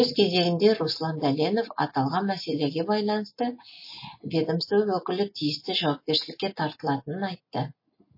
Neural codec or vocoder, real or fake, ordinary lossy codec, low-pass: none; real; MP3, 24 kbps; 5.4 kHz